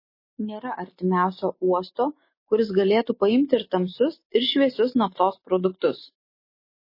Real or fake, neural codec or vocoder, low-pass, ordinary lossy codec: real; none; 5.4 kHz; MP3, 24 kbps